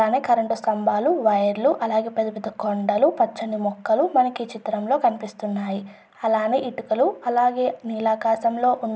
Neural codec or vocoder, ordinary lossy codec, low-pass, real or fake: none; none; none; real